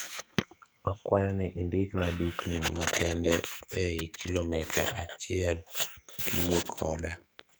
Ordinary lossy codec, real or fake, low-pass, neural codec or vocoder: none; fake; none; codec, 44.1 kHz, 2.6 kbps, SNAC